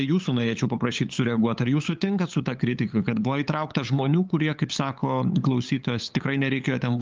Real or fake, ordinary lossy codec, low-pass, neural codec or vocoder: fake; Opus, 32 kbps; 7.2 kHz; codec, 16 kHz, 8 kbps, FunCodec, trained on LibriTTS, 25 frames a second